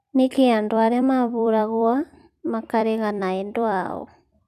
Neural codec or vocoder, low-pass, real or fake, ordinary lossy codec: vocoder, 44.1 kHz, 128 mel bands every 256 samples, BigVGAN v2; 14.4 kHz; fake; none